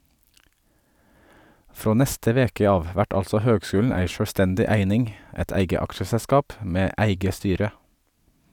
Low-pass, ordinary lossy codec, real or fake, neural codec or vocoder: 19.8 kHz; none; real; none